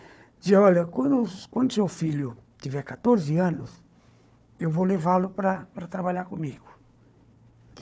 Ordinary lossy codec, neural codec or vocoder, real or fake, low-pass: none; codec, 16 kHz, 4 kbps, FunCodec, trained on Chinese and English, 50 frames a second; fake; none